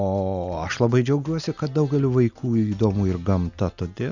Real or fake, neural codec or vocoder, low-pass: real; none; 7.2 kHz